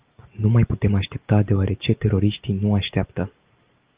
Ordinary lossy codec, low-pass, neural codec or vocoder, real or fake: Opus, 32 kbps; 3.6 kHz; none; real